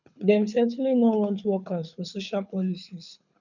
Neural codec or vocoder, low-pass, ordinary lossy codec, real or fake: codec, 24 kHz, 6 kbps, HILCodec; 7.2 kHz; none; fake